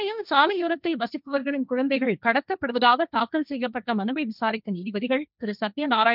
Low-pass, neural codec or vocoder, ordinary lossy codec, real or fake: 5.4 kHz; codec, 16 kHz, 1.1 kbps, Voila-Tokenizer; none; fake